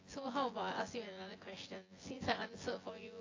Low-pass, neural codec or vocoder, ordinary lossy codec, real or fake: 7.2 kHz; vocoder, 24 kHz, 100 mel bands, Vocos; AAC, 32 kbps; fake